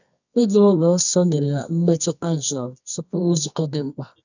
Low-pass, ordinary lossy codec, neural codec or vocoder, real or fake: 7.2 kHz; none; codec, 24 kHz, 0.9 kbps, WavTokenizer, medium music audio release; fake